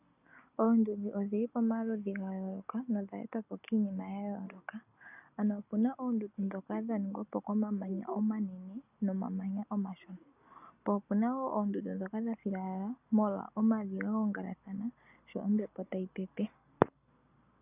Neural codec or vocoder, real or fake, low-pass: none; real; 3.6 kHz